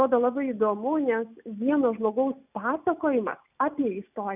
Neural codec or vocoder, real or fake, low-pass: none; real; 3.6 kHz